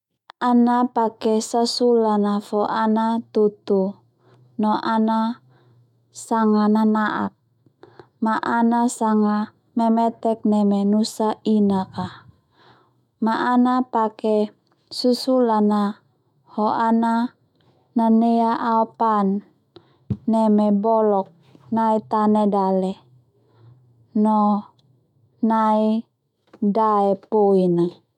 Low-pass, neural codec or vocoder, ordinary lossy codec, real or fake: 19.8 kHz; autoencoder, 48 kHz, 128 numbers a frame, DAC-VAE, trained on Japanese speech; none; fake